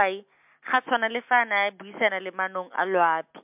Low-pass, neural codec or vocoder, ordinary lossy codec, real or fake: 3.6 kHz; none; MP3, 32 kbps; real